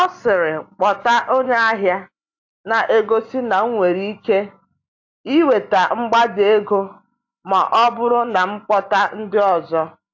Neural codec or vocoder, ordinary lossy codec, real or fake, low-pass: none; AAC, 48 kbps; real; 7.2 kHz